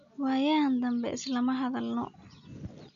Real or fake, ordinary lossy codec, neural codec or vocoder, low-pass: real; none; none; 7.2 kHz